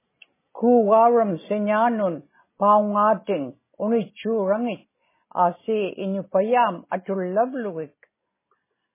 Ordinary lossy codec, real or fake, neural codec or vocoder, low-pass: MP3, 16 kbps; real; none; 3.6 kHz